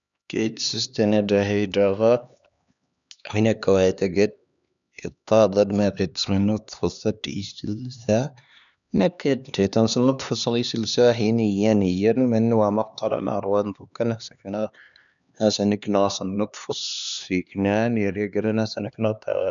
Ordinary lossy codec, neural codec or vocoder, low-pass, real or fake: none; codec, 16 kHz, 2 kbps, X-Codec, HuBERT features, trained on LibriSpeech; 7.2 kHz; fake